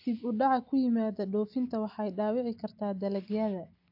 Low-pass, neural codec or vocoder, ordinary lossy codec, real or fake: 5.4 kHz; none; AAC, 48 kbps; real